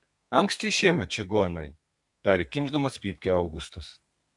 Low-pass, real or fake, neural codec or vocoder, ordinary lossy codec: 10.8 kHz; fake; codec, 32 kHz, 1.9 kbps, SNAC; AAC, 64 kbps